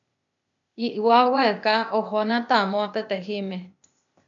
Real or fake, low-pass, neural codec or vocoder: fake; 7.2 kHz; codec, 16 kHz, 0.8 kbps, ZipCodec